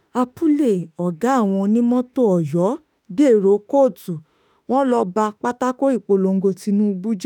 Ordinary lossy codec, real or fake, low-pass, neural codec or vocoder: none; fake; none; autoencoder, 48 kHz, 32 numbers a frame, DAC-VAE, trained on Japanese speech